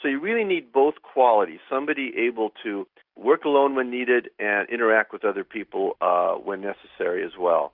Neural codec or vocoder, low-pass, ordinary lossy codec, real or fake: none; 5.4 kHz; Opus, 64 kbps; real